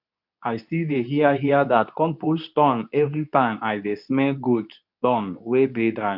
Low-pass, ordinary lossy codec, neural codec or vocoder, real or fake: 5.4 kHz; none; codec, 24 kHz, 0.9 kbps, WavTokenizer, medium speech release version 2; fake